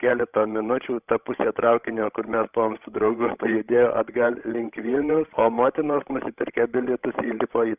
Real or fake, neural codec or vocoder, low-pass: fake; codec, 16 kHz, 16 kbps, FreqCodec, larger model; 3.6 kHz